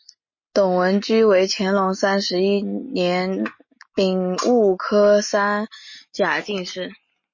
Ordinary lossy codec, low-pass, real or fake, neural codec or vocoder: MP3, 32 kbps; 7.2 kHz; real; none